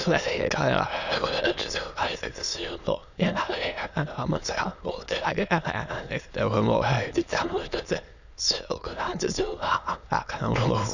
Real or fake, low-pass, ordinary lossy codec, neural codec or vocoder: fake; 7.2 kHz; none; autoencoder, 22.05 kHz, a latent of 192 numbers a frame, VITS, trained on many speakers